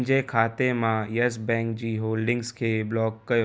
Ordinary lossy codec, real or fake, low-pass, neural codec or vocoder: none; real; none; none